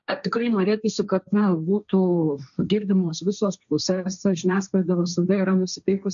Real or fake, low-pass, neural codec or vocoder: fake; 7.2 kHz; codec, 16 kHz, 1.1 kbps, Voila-Tokenizer